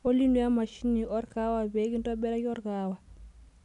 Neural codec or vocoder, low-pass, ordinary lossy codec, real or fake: none; 10.8 kHz; none; real